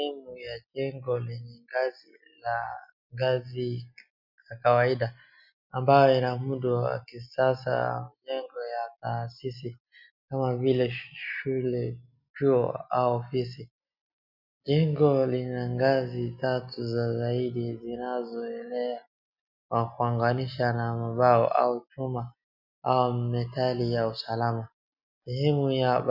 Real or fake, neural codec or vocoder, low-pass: real; none; 5.4 kHz